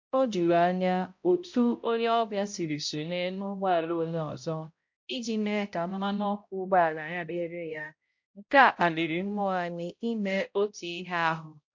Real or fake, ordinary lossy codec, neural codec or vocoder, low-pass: fake; MP3, 48 kbps; codec, 16 kHz, 0.5 kbps, X-Codec, HuBERT features, trained on balanced general audio; 7.2 kHz